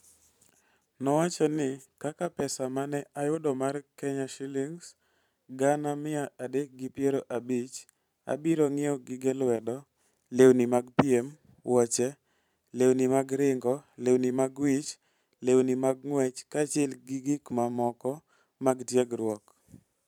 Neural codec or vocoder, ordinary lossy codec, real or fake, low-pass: vocoder, 44.1 kHz, 128 mel bands every 512 samples, BigVGAN v2; none; fake; 19.8 kHz